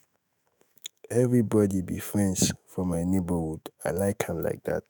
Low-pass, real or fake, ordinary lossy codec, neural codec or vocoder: none; fake; none; autoencoder, 48 kHz, 128 numbers a frame, DAC-VAE, trained on Japanese speech